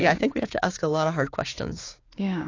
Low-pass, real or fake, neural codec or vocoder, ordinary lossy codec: 7.2 kHz; fake; codec, 16 kHz, 6 kbps, DAC; AAC, 32 kbps